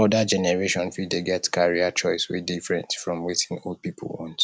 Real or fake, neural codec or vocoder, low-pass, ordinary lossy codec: real; none; none; none